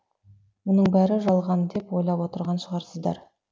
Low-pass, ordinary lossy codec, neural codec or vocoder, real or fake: none; none; none; real